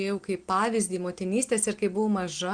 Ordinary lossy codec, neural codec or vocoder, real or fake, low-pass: Opus, 24 kbps; none; real; 9.9 kHz